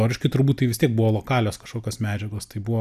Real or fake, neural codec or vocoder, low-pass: real; none; 14.4 kHz